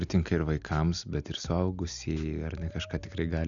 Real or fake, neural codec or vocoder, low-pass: real; none; 7.2 kHz